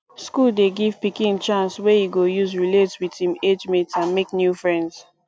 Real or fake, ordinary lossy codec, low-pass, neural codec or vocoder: real; none; none; none